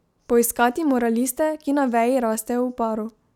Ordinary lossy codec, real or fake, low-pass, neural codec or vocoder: none; real; 19.8 kHz; none